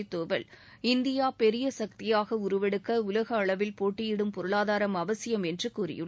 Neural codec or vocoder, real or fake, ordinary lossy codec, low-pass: none; real; none; none